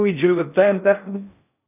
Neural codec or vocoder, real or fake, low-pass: codec, 16 kHz in and 24 kHz out, 0.6 kbps, FocalCodec, streaming, 4096 codes; fake; 3.6 kHz